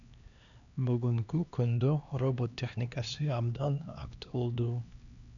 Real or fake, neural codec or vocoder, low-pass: fake; codec, 16 kHz, 2 kbps, X-Codec, HuBERT features, trained on LibriSpeech; 7.2 kHz